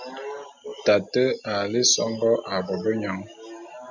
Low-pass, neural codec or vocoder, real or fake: 7.2 kHz; none; real